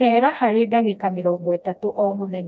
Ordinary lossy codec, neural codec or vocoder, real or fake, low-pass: none; codec, 16 kHz, 1 kbps, FreqCodec, smaller model; fake; none